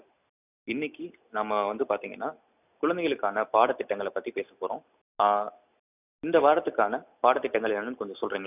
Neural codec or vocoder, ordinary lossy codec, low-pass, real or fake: none; none; 3.6 kHz; real